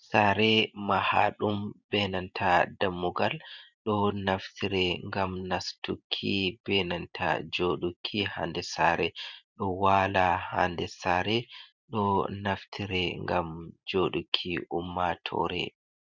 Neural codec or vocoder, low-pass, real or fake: vocoder, 44.1 kHz, 128 mel bands every 512 samples, BigVGAN v2; 7.2 kHz; fake